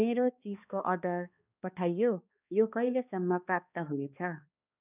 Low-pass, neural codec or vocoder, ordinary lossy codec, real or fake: 3.6 kHz; codec, 16 kHz, 2 kbps, X-Codec, HuBERT features, trained on balanced general audio; none; fake